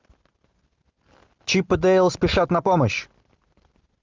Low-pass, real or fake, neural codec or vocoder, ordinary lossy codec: 7.2 kHz; real; none; Opus, 32 kbps